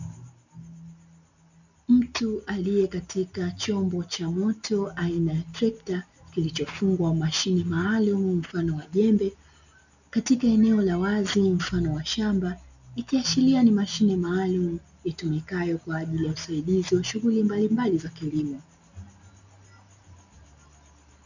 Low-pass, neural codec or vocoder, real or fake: 7.2 kHz; none; real